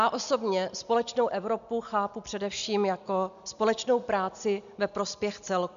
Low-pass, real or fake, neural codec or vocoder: 7.2 kHz; real; none